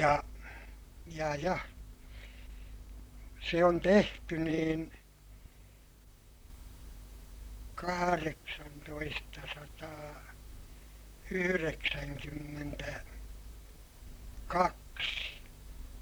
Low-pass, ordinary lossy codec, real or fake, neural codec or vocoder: none; none; fake; vocoder, 44.1 kHz, 128 mel bands, Pupu-Vocoder